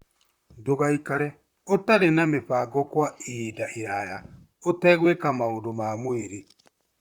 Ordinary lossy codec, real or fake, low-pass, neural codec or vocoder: Opus, 64 kbps; fake; 19.8 kHz; vocoder, 44.1 kHz, 128 mel bands, Pupu-Vocoder